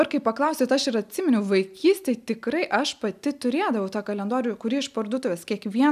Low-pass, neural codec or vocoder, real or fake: 14.4 kHz; none; real